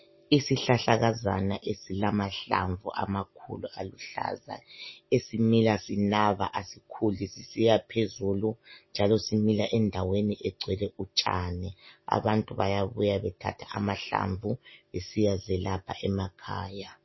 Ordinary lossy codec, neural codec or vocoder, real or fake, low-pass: MP3, 24 kbps; none; real; 7.2 kHz